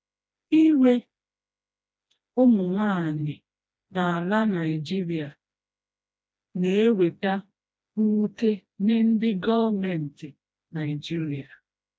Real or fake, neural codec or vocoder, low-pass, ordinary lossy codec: fake; codec, 16 kHz, 1 kbps, FreqCodec, smaller model; none; none